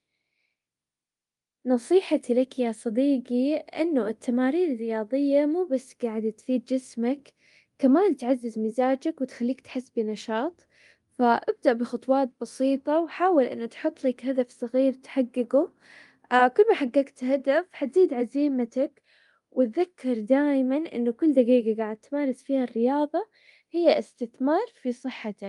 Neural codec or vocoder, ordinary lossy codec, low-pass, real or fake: codec, 24 kHz, 0.9 kbps, DualCodec; Opus, 32 kbps; 10.8 kHz; fake